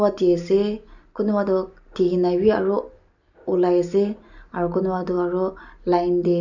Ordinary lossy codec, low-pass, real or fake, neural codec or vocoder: none; 7.2 kHz; real; none